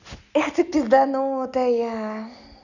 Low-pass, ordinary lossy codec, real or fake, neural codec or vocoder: 7.2 kHz; none; real; none